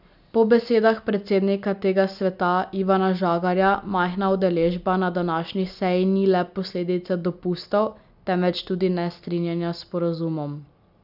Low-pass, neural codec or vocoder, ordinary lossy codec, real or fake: 5.4 kHz; none; none; real